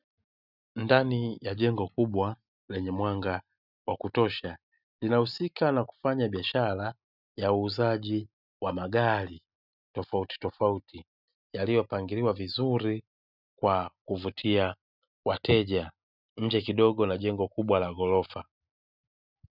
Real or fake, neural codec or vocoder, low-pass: real; none; 5.4 kHz